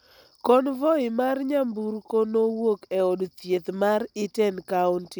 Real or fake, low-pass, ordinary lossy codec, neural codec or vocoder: real; none; none; none